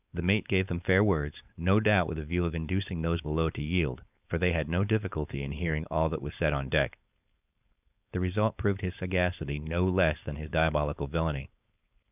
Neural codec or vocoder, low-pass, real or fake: codec, 16 kHz, 4.8 kbps, FACodec; 3.6 kHz; fake